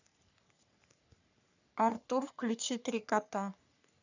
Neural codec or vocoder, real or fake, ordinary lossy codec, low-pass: codec, 44.1 kHz, 3.4 kbps, Pupu-Codec; fake; none; 7.2 kHz